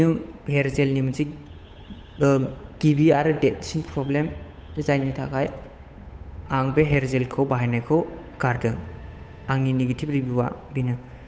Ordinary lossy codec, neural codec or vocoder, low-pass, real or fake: none; codec, 16 kHz, 8 kbps, FunCodec, trained on Chinese and English, 25 frames a second; none; fake